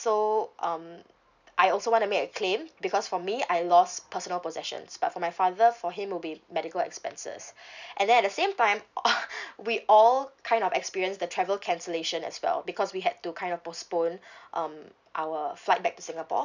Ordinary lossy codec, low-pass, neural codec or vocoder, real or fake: none; 7.2 kHz; none; real